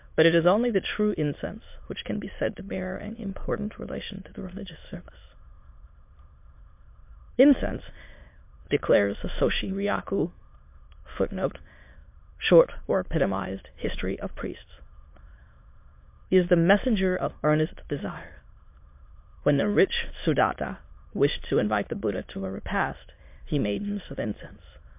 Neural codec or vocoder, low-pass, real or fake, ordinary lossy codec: autoencoder, 22.05 kHz, a latent of 192 numbers a frame, VITS, trained on many speakers; 3.6 kHz; fake; MP3, 32 kbps